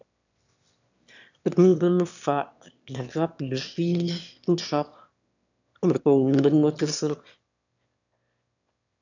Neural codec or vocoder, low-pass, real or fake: autoencoder, 22.05 kHz, a latent of 192 numbers a frame, VITS, trained on one speaker; 7.2 kHz; fake